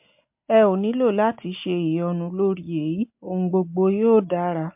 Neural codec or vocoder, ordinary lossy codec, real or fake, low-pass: none; MP3, 32 kbps; real; 3.6 kHz